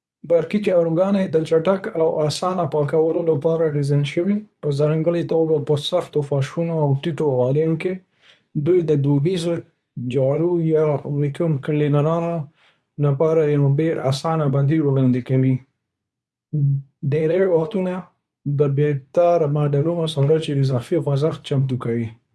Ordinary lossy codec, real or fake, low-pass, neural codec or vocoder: none; fake; none; codec, 24 kHz, 0.9 kbps, WavTokenizer, medium speech release version 2